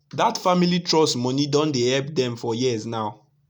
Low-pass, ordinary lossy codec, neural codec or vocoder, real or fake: 19.8 kHz; none; none; real